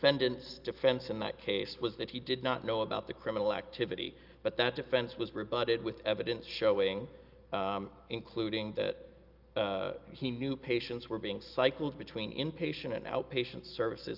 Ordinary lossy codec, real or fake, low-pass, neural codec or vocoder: Opus, 24 kbps; real; 5.4 kHz; none